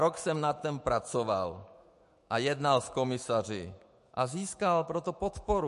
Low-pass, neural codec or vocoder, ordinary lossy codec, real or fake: 14.4 kHz; autoencoder, 48 kHz, 128 numbers a frame, DAC-VAE, trained on Japanese speech; MP3, 48 kbps; fake